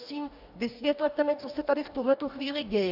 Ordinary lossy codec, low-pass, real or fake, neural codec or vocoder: AAC, 48 kbps; 5.4 kHz; fake; codec, 44.1 kHz, 2.6 kbps, DAC